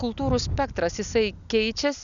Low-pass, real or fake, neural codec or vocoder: 7.2 kHz; real; none